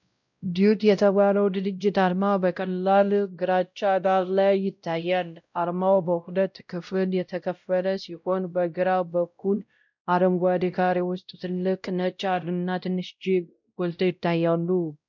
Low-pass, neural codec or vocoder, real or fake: 7.2 kHz; codec, 16 kHz, 0.5 kbps, X-Codec, WavLM features, trained on Multilingual LibriSpeech; fake